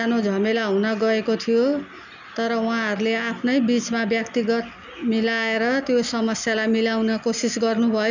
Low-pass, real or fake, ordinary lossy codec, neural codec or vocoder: 7.2 kHz; real; none; none